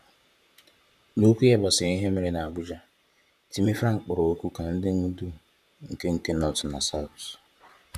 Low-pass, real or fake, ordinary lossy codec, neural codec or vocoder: 14.4 kHz; fake; none; vocoder, 44.1 kHz, 128 mel bands, Pupu-Vocoder